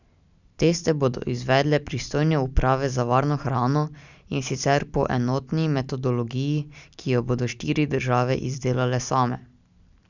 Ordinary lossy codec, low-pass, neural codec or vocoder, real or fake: none; 7.2 kHz; none; real